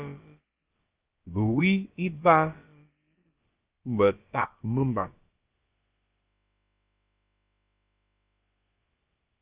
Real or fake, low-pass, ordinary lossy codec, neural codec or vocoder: fake; 3.6 kHz; Opus, 32 kbps; codec, 16 kHz, about 1 kbps, DyCAST, with the encoder's durations